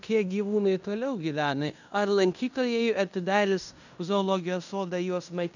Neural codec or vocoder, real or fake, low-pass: codec, 16 kHz in and 24 kHz out, 0.9 kbps, LongCat-Audio-Codec, fine tuned four codebook decoder; fake; 7.2 kHz